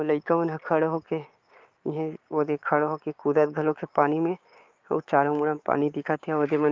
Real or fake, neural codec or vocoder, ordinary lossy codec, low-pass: fake; codec, 16 kHz, 6 kbps, DAC; Opus, 32 kbps; 7.2 kHz